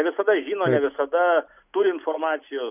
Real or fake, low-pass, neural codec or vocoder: real; 3.6 kHz; none